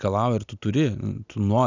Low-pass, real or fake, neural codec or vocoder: 7.2 kHz; real; none